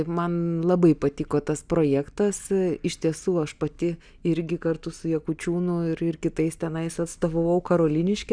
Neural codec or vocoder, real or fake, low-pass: none; real; 9.9 kHz